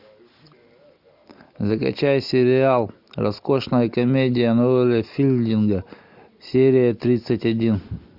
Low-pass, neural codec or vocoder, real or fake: 5.4 kHz; none; real